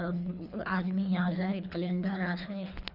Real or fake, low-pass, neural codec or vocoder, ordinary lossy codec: fake; 5.4 kHz; codec, 24 kHz, 3 kbps, HILCodec; none